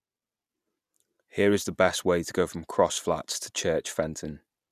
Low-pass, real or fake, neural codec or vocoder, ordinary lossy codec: 14.4 kHz; real; none; none